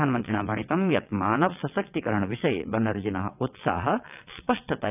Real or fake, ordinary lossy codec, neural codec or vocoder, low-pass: fake; none; vocoder, 22.05 kHz, 80 mel bands, WaveNeXt; 3.6 kHz